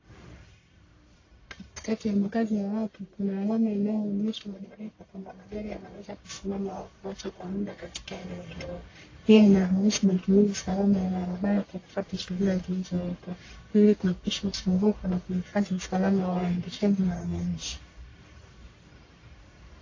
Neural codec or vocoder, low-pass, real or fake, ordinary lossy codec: codec, 44.1 kHz, 1.7 kbps, Pupu-Codec; 7.2 kHz; fake; AAC, 32 kbps